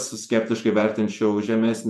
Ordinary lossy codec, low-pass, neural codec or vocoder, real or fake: MP3, 96 kbps; 14.4 kHz; vocoder, 48 kHz, 128 mel bands, Vocos; fake